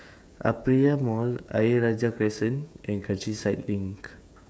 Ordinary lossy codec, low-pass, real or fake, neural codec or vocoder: none; none; fake; codec, 16 kHz, 16 kbps, FreqCodec, smaller model